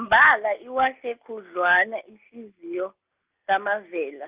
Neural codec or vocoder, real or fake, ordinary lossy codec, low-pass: none; real; Opus, 24 kbps; 3.6 kHz